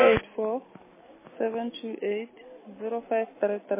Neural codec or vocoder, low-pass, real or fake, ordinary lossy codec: none; 3.6 kHz; real; MP3, 16 kbps